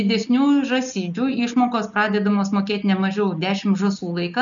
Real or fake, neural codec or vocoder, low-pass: real; none; 7.2 kHz